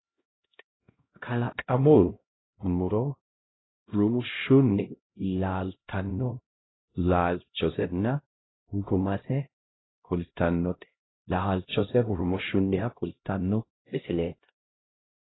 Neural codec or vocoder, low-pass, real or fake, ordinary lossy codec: codec, 16 kHz, 0.5 kbps, X-Codec, HuBERT features, trained on LibriSpeech; 7.2 kHz; fake; AAC, 16 kbps